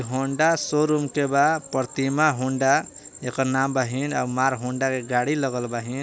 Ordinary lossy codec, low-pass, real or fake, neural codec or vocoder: none; none; real; none